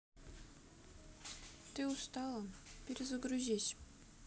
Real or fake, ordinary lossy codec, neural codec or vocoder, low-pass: real; none; none; none